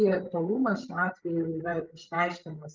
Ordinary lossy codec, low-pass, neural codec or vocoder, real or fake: Opus, 24 kbps; 7.2 kHz; codec, 16 kHz, 16 kbps, FreqCodec, larger model; fake